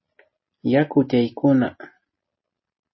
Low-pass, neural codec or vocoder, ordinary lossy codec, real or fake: 7.2 kHz; none; MP3, 24 kbps; real